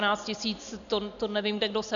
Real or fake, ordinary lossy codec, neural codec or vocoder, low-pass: real; MP3, 96 kbps; none; 7.2 kHz